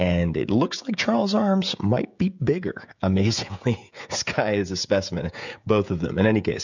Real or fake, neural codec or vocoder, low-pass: fake; codec, 16 kHz, 16 kbps, FreqCodec, smaller model; 7.2 kHz